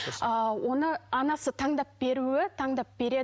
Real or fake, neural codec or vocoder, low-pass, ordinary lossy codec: real; none; none; none